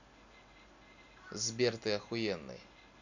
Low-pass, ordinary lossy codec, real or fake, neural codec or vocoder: 7.2 kHz; none; real; none